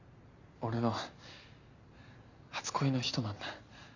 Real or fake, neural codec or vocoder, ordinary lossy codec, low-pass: real; none; none; 7.2 kHz